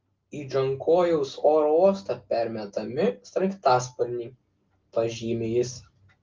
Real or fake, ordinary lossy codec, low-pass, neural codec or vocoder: real; Opus, 32 kbps; 7.2 kHz; none